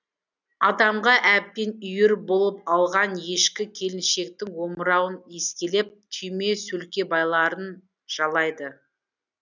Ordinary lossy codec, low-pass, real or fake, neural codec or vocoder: none; 7.2 kHz; real; none